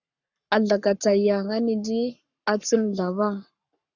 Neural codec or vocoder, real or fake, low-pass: codec, 44.1 kHz, 7.8 kbps, Pupu-Codec; fake; 7.2 kHz